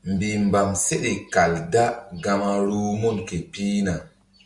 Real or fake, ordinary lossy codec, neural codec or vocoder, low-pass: real; Opus, 64 kbps; none; 10.8 kHz